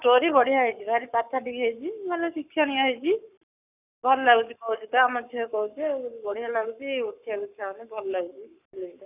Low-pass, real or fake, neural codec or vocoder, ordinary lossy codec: 3.6 kHz; fake; codec, 44.1 kHz, 7.8 kbps, Pupu-Codec; none